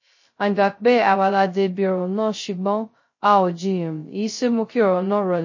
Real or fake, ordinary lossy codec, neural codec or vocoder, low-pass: fake; MP3, 32 kbps; codec, 16 kHz, 0.2 kbps, FocalCodec; 7.2 kHz